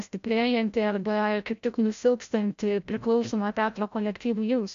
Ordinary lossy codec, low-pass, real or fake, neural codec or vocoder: AAC, 64 kbps; 7.2 kHz; fake; codec, 16 kHz, 0.5 kbps, FreqCodec, larger model